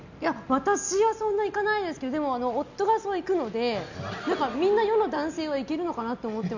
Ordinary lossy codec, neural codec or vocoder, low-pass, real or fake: none; none; 7.2 kHz; real